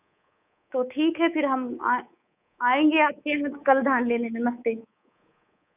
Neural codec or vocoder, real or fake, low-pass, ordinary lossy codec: codec, 24 kHz, 3.1 kbps, DualCodec; fake; 3.6 kHz; none